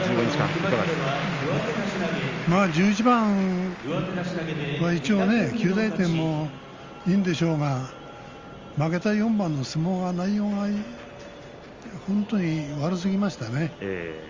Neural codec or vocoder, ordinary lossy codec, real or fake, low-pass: none; Opus, 32 kbps; real; 7.2 kHz